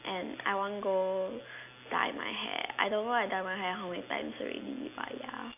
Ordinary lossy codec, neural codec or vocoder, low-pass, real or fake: none; none; 3.6 kHz; real